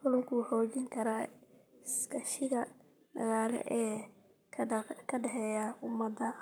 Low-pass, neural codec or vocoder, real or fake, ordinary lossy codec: none; codec, 44.1 kHz, 7.8 kbps, Pupu-Codec; fake; none